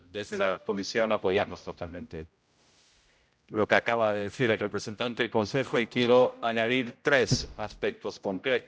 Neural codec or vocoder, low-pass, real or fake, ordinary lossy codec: codec, 16 kHz, 0.5 kbps, X-Codec, HuBERT features, trained on general audio; none; fake; none